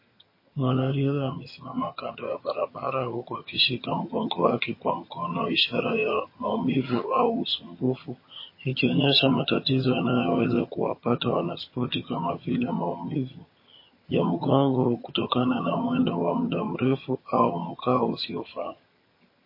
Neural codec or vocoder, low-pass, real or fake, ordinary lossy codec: vocoder, 22.05 kHz, 80 mel bands, HiFi-GAN; 5.4 kHz; fake; MP3, 24 kbps